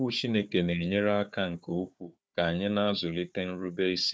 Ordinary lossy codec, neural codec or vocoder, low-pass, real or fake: none; codec, 16 kHz, 4 kbps, FunCodec, trained on Chinese and English, 50 frames a second; none; fake